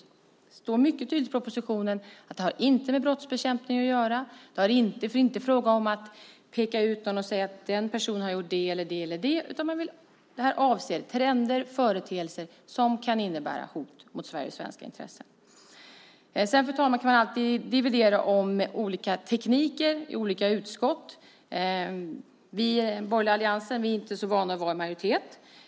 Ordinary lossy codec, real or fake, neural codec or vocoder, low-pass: none; real; none; none